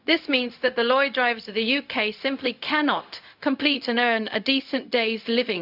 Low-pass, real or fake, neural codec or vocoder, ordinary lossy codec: 5.4 kHz; fake; codec, 16 kHz, 0.4 kbps, LongCat-Audio-Codec; none